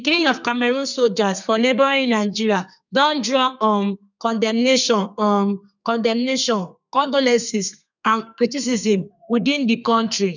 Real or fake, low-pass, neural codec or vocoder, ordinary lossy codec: fake; 7.2 kHz; codec, 32 kHz, 1.9 kbps, SNAC; none